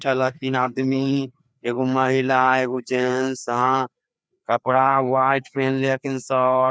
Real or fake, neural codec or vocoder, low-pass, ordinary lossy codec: fake; codec, 16 kHz, 2 kbps, FreqCodec, larger model; none; none